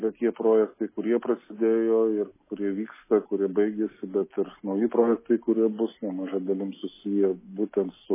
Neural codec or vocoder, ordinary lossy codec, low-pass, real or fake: none; MP3, 16 kbps; 3.6 kHz; real